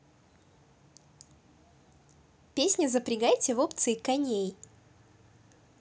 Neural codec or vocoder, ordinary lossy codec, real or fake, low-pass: none; none; real; none